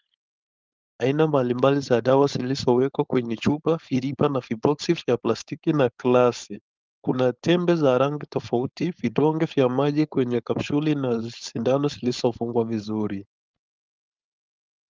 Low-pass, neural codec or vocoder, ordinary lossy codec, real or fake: 7.2 kHz; codec, 16 kHz, 4.8 kbps, FACodec; Opus, 32 kbps; fake